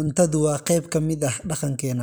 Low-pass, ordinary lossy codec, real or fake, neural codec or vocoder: none; none; real; none